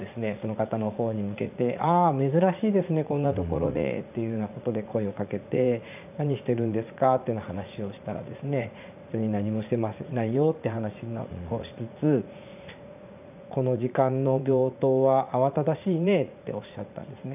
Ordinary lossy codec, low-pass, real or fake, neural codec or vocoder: none; 3.6 kHz; fake; vocoder, 44.1 kHz, 80 mel bands, Vocos